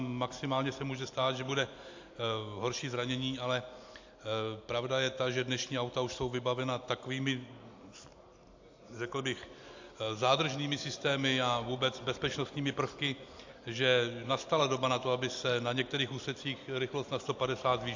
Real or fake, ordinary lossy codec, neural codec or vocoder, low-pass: real; AAC, 48 kbps; none; 7.2 kHz